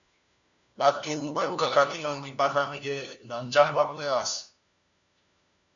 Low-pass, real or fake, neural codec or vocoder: 7.2 kHz; fake; codec, 16 kHz, 1 kbps, FunCodec, trained on LibriTTS, 50 frames a second